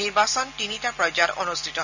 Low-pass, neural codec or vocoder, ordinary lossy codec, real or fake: 7.2 kHz; none; none; real